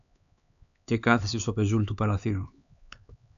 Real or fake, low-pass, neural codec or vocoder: fake; 7.2 kHz; codec, 16 kHz, 4 kbps, X-Codec, HuBERT features, trained on LibriSpeech